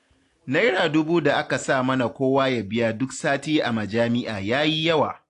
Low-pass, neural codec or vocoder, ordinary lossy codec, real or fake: 10.8 kHz; none; AAC, 48 kbps; real